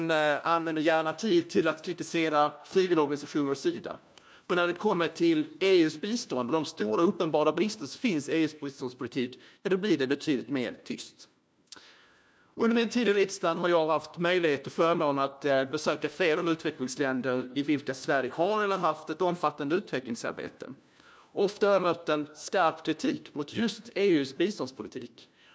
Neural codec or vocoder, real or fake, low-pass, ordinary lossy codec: codec, 16 kHz, 1 kbps, FunCodec, trained on LibriTTS, 50 frames a second; fake; none; none